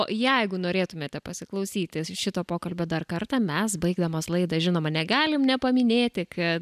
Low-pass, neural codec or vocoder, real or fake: 14.4 kHz; none; real